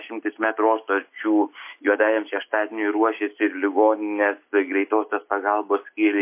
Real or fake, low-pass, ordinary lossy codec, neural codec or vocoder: real; 3.6 kHz; MP3, 24 kbps; none